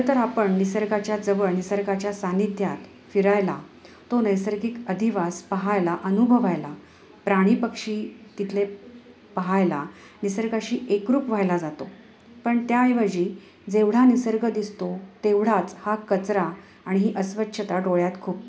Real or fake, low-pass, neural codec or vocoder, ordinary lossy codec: real; none; none; none